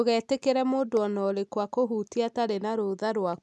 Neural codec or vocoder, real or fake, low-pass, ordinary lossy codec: none; real; none; none